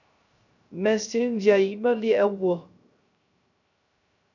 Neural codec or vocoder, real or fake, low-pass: codec, 16 kHz, 0.3 kbps, FocalCodec; fake; 7.2 kHz